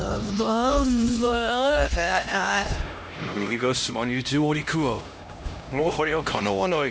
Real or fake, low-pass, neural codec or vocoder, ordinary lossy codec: fake; none; codec, 16 kHz, 1 kbps, X-Codec, HuBERT features, trained on LibriSpeech; none